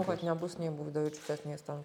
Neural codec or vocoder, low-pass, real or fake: none; 19.8 kHz; real